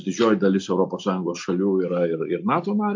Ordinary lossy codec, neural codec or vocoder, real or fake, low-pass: MP3, 48 kbps; none; real; 7.2 kHz